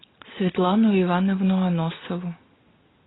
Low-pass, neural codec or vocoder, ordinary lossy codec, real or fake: 7.2 kHz; none; AAC, 16 kbps; real